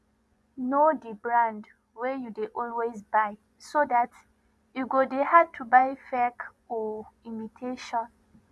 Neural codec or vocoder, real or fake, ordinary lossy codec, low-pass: vocoder, 24 kHz, 100 mel bands, Vocos; fake; none; none